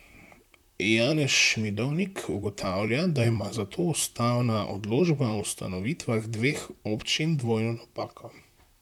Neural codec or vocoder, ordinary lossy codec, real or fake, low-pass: vocoder, 44.1 kHz, 128 mel bands, Pupu-Vocoder; none; fake; 19.8 kHz